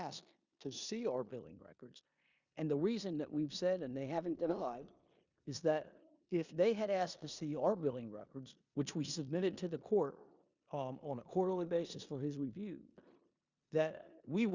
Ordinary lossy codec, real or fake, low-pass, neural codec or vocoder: Opus, 64 kbps; fake; 7.2 kHz; codec, 16 kHz in and 24 kHz out, 0.9 kbps, LongCat-Audio-Codec, four codebook decoder